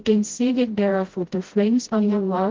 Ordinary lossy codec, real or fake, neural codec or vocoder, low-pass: Opus, 16 kbps; fake; codec, 16 kHz, 0.5 kbps, FreqCodec, smaller model; 7.2 kHz